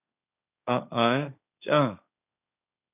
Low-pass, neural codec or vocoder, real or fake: 3.6 kHz; codec, 16 kHz, 1.1 kbps, Voila-Tokenizer; fake